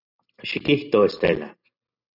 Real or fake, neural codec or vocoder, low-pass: real; none; 5.4 kHz